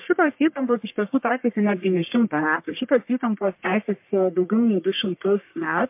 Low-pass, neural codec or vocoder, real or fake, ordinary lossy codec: 3.6 kHz; codec, 44.1 kHz, 1.7 kbps, Pupu-Codec; fake; MP3, 32 kbps